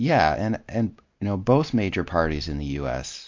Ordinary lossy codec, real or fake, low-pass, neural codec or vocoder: MP3, 48 kbps; real; 7.2 kHz; none